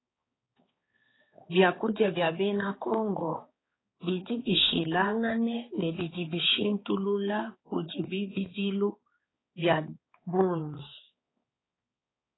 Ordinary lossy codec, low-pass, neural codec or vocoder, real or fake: AAC, 16 kbps; 7.2 kHz; codec, 16 kHz, 4 kbps, X-Codec, HuBERT features, trained on balanced general audio; fake